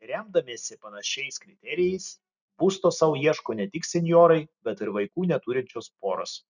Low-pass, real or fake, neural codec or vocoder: 7.2 kHz; real; none